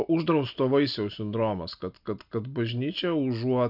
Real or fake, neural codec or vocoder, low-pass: real; none; 5.4 kHz